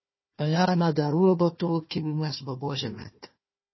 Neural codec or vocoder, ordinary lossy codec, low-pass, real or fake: codec, 16 kHz, 1 kbps, FunCodec, trained on Chinese and English, 50 frames a second; MP3, 24 kbps; 7.2 kHz; fake